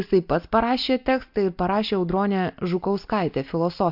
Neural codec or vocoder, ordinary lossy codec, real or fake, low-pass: none; MP3, 48 kbps; real; 5.4 kHz